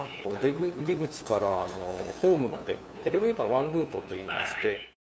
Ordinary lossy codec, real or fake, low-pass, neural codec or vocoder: none; fake; none; codec, 16 kHz, 2 kbps, FunCodec, trained on LibriTTS, 25 frames a second